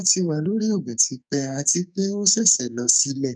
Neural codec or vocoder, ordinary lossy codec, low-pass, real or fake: codec, 44.1 kHz, 2.6 kbps, SNAC; none; 9.9 kHz; fake